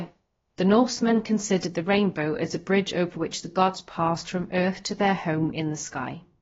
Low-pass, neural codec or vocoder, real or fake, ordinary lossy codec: 7.2 kHz; codec, 16 kHz, about 1 kbps, DyCAST, with the encoder's durations; fake; AAC, 24 kbps